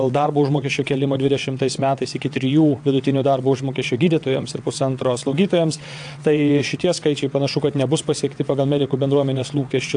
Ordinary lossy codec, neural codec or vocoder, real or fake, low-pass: AAC, 64 kbps; vocoder, 22.05 kHz, 80 mel bands, WaveNeXt; fake; 9.9 kHz